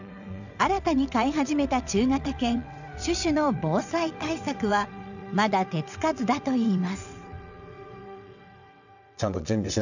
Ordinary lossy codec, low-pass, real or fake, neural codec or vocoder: none; 7.2 kHz; fake; vocoder, 22.05 kHz, 80 mel bands, WaveNeXt